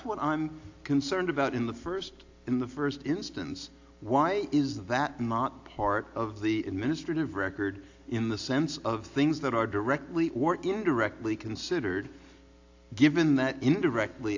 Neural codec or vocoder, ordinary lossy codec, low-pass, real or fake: none; AAC, 48 kbps; 7.2 kHz; real